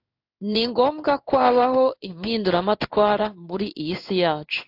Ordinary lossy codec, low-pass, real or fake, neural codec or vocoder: AAC, 48 kbps; 5.4 kHz; fake; codec, 16 kHz in and 24 kHz out, 1 kbps, XY-Tokenizer